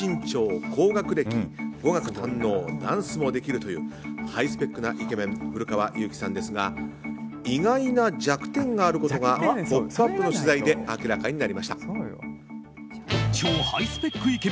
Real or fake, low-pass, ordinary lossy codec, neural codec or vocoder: real; none; none; none